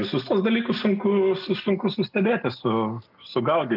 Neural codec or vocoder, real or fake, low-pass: none; real; 5.4 kHz